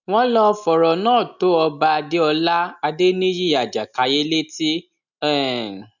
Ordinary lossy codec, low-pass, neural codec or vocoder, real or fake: none; 7.2 kHz; none; real